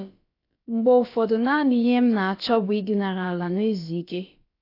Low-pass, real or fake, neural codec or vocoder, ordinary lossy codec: 5.4 kHz; fake; codec, 16 kHz, about 1 kbps, DyCAST, with the encoder's durations; AAC, 32 kbps